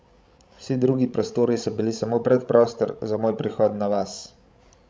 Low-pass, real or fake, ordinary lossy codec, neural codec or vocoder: none; fake; none; codec, 16 kHz, 16 kbps, FreqCodec, larger model